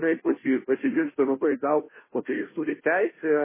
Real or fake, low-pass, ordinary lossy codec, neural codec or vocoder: fake; 3.6 kHz; MP3, 16 kbps; codec, 16 kHz, 0.5 kbps, FunCodec, trained on Chinese and English, 25 frames a second